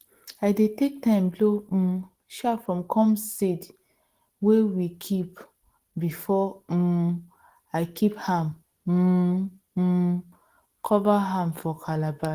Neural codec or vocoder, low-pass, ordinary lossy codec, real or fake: none; 14.4 kHz; Opus, 16 kbps; real